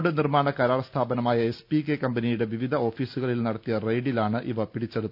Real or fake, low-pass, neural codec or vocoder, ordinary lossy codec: real; 5.4 kHz; none; none